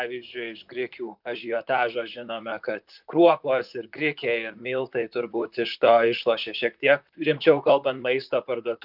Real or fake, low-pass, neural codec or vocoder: fake; 5.4 kHz; vocoder, 44.1 kHz, 128 mel bands, Pupu-Vocoder